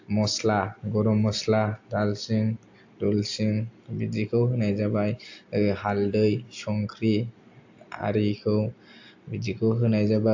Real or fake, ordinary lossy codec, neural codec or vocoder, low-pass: real; AAC, 48 kbps; none; 7.2 kHz